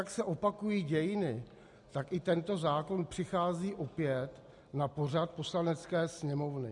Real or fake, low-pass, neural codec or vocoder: real; 10.8 kHz; none